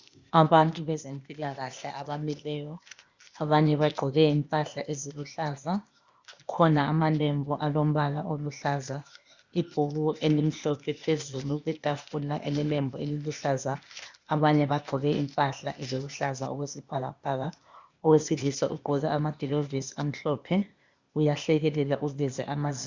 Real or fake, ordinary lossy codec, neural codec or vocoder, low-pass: fake; Opus, 64 kbps; codec, 16 kHz, 0.8 kbps, ZipCodec; 7.2 kHz